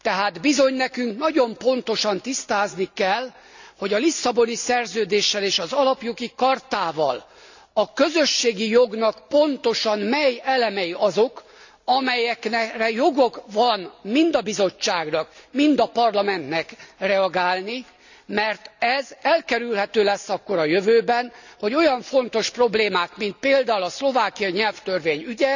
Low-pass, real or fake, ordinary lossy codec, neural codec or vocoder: 7.2 kHz; real; none; none